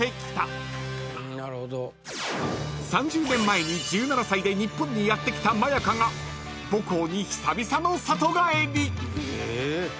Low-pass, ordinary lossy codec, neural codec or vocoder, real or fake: none; none; none; real